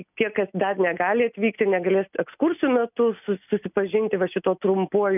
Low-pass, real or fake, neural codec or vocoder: 3.6 kHz; real; none